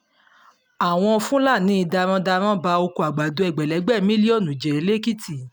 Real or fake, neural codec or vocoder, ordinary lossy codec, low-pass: real; none; none; none